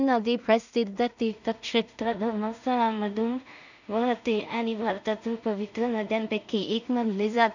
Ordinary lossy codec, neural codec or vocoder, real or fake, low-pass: none; codec, 16 kHz in and 24 kHz out, 0.4 kbps, LongCat-Audio-Codec, two codebook decoder; fake; 7.2 kHz